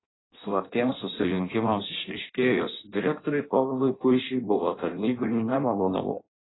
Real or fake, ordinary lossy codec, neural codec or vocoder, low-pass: fake; AAC, 16 kbps; codec, 16 kHz in and 24 kHz out, 0.6 kbps, FireRedTTS-2 codec; 7.2 kHz